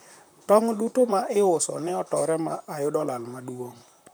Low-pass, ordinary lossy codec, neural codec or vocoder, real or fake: none; none; vocoder, 44.1 kHz, 128 mel bands, Pupu-Vocoder; fake